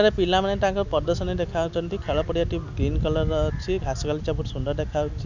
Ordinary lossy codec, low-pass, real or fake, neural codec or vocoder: MP3, 64 kbps; 7.2 kHz; real; none